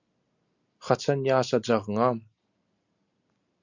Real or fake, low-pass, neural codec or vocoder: real; 7.2 kHz; none